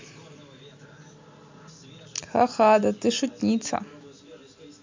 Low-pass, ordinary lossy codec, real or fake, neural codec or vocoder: 7.2 kHz; MP3, 64 kbps; real; none